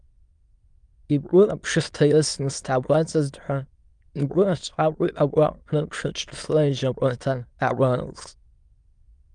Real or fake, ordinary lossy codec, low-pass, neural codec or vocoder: fake; Opus, 32 kbps; 9.9 kHz; autoencoder, 22.05 kHz, a latent of 192 numbers a frame, VITS, trained on many speakers